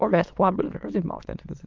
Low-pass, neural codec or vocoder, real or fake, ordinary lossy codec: 7.2 kHz; autoencoder, 22.05 kHz, a latent of 192 numbers a frame, VITS, trained on many speakers; fake; Opus, 32 kbps